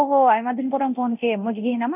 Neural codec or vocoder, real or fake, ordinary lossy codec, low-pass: codec, 24 kHz, 0.9 kbps, DualCodec; fake; none; 3.6 kHz